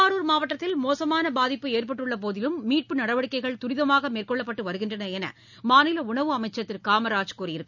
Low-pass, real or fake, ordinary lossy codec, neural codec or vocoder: 7.2 kHz; real; none; none